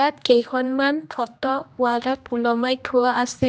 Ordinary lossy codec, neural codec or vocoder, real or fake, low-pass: none; codec, 16 kHz, 1 kbps, X-Codec, HuBERT features, trained on general audio; fake; none